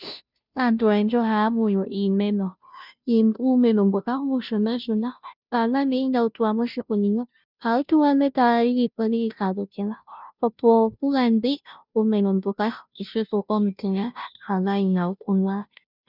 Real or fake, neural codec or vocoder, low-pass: fake; codec, 16 kHz, 0.5 kbps, FunCodec, trained on Chinese and English, 25 frames a second; 5.4 kHz